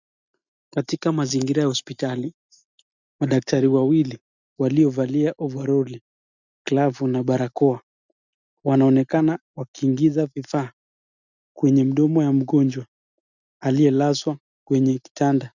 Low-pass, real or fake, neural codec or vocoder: 7.2 kHz; real; none